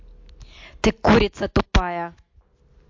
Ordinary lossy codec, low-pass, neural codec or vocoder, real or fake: MP3, 48 kbps; 7.2 kHz; none; real